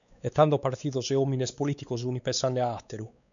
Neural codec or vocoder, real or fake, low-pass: codec, 16 kHz, 4 kbps, X-Codec, WavLM features, trained on Multilingual LibriSpeech; fake; 7.2 kHz